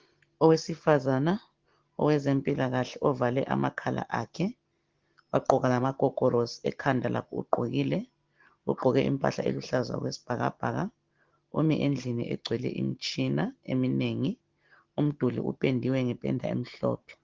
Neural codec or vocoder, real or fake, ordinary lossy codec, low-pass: none; real; Opus, 16 kbps; 7.2 kHz